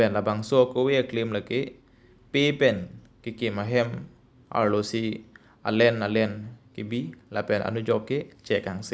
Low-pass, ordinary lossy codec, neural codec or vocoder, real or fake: none; none; none; real